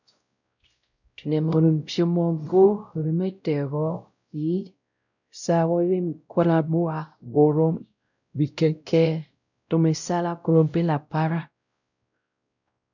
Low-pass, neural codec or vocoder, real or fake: 7.2 kHz; codec, 16 kHz, 0.5 kbps, X-Codec, WavLM features, trained on Multilingual LibriSpeech; fake